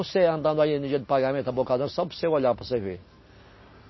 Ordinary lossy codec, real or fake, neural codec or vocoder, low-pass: MP3, 24 kbps; real; none; 7.2 kHz